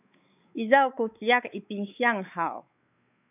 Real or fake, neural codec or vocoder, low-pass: fake; codec, 16 kHz, 4 kbps, X-Codec, WavLM features, trained on Multilingual LibriSpeech; 3.6 kHz